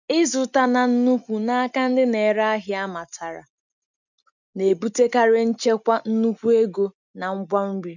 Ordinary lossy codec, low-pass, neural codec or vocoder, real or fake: none; 7.2 kHz; none; real